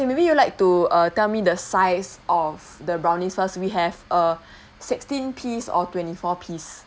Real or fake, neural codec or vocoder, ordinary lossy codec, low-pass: real; none; none; none